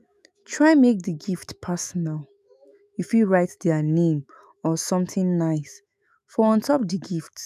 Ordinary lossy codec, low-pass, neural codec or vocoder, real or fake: none; 14.4 kHz; autoencoder, 48 kHz, 128 numbers a frame, DAC-VAE, trained on Japanese speech; fake